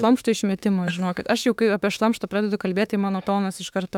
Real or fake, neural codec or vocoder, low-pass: fake; autoencoder, 48 kHz, 32 numbers a frame, DAC-VAE, trained on Japanese speech; 19.8 kHz